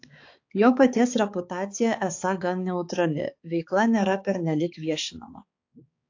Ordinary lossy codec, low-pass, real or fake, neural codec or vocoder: AAC, 48 kbps; 7.2 kHz; fake; codec, 16 kHz, 4 kbps, X-Codec, HuBERT features, trained on balanced general audio